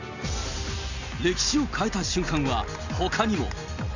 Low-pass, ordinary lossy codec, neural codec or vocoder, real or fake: 7.2 kHz; none; none; real